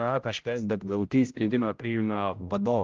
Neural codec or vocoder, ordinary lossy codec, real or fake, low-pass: codec, 16 kHz, 0.5 kbps, X-Codec, HuBERT features, trained on general audio; Opus, 32 kbps; fake; 7.2 kHz